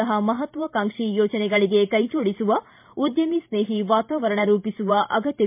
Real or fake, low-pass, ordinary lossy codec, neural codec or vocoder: fake; 3.6 kHz; MP3, 32 kbps; vocoder, 44.1 kHz, 128 mel bands every 512 samples, BigVGAN v2